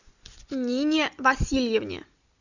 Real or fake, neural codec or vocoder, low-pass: real; none; 7.2 kHz